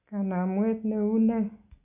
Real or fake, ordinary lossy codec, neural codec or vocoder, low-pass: real; none; none; 3.6 kHz